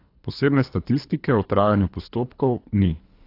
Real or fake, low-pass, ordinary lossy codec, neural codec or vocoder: fake; 5.4 kHz; AAC, 32 kbps; codec, 24 kHz, 3 kbps, HILCodec